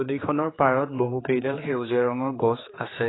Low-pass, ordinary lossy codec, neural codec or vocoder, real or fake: 7.2 kHz; AAC, 16 kbps; codec, 16 kHz, 4 kbps, X-Codec, HuBERT features, trained on general audio; fake